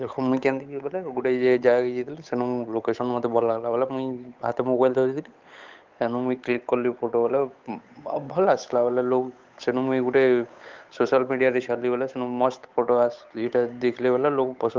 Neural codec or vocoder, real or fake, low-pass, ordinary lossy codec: codec, 16 kHz, 8 kbps, FunCodec, trained on Chinese and English, 25 frames a second; fake; 7.2 kHz; Opus, 24 kbps